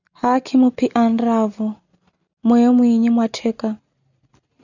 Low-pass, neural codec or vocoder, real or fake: 7.2 kHz; none; real